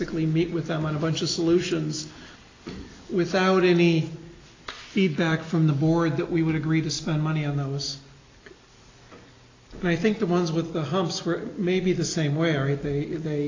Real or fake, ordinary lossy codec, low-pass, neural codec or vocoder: real; AAC, 32 kbps; 7.2 kHz; none